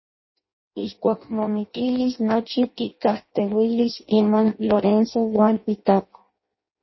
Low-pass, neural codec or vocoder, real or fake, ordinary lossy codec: 7.2 kHz; codec, 16 kHz in and 24 kHz out, 0.6 kbps, FireRedTTS-2 codec; fake; MP3, 24 kbps